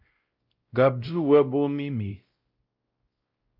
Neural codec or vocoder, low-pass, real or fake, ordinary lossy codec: codec, 16 kHz, 0.5 kbps, X-Codec, WavLM features, trained on Multilingual LibriSpeech; 5.4 kHz; fake; Opus, 24 kbps